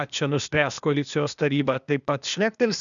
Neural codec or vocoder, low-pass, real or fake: codec, 16 kHz, 0.8 kbps, ZipCodec; 7.2 kHz; fake